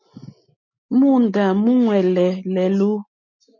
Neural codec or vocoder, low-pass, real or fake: none; 7.2 kHz; real